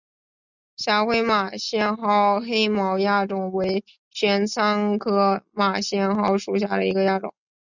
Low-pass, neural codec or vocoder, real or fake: 7.2 kHz; none; real